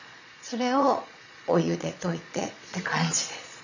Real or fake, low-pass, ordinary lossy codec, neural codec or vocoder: fake; 7.2 kHz; none; vocoder, 22.05 kHz, 80 mel bands, Vocos